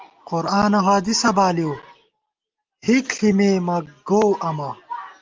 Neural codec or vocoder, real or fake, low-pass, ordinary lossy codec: none; real; 7.2 kHz; Opus, 24 kbps